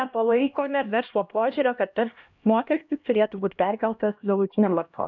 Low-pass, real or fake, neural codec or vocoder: 7.2 kHz; fake; codec, 16 kHz, 1 kbps, X-Codec, HuBERT features, trained on LibriSpeech